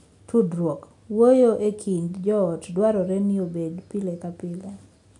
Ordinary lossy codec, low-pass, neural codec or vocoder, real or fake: none; 10.8 kHz; none; real